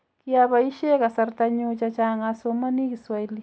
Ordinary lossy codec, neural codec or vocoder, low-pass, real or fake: none; none; none; real